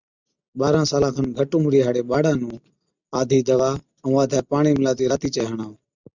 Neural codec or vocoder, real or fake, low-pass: none; real; 7.2 kHz